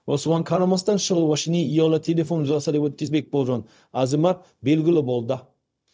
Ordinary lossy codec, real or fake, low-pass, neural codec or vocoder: none; fake; none; codec, 16 kHz, 0.4 kbps, LongCat-Audio-Codec